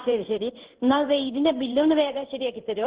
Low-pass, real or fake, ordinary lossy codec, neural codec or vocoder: 3.6 kHz; fake; Opus, 16 kbps; codec, 16 kHz in and 24 kHz out, 1 kbps, XY-Tokenizer